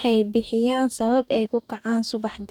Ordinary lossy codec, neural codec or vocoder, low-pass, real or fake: none; codec, 44.1 kHz, 2.6 kbps, DAC; 19.8 kHz; fake